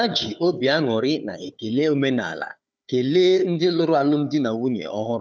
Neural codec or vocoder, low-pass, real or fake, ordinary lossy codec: codec, 16 kHz, 4 kbps, FunCodec, trained on Chinese and English, 50 frames a second; none; fake; none